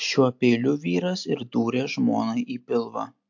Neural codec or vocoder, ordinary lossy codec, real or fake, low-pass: none; MP3, 48 kbps; real; 7.2 kHz